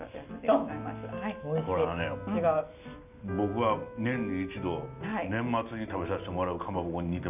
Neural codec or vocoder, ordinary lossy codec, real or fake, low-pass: none; none; real; 3.6 kHz